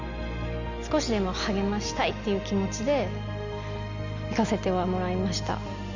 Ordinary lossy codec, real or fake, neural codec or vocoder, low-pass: Opus, 64 kbps; real; none; 7.2 kHz